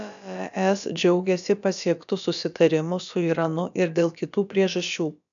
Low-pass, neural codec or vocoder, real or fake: 7.2 kHz; codec, 16 kHz, about 1 kbps, DyCAST, with the encoder's durations; fake